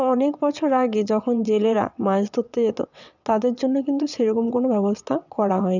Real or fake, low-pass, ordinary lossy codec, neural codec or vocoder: fake; 7.2 kHz; none; vocoder, 22.05 kHz, 80 mel bands, WaveNeXt